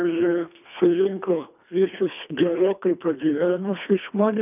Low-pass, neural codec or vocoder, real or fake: 3.6 kHz; codec, 24 kHz, 1.5 kbps, HILCodec; fake